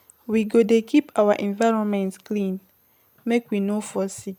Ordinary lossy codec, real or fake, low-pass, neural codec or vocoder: none; real; 19.8 kHz; none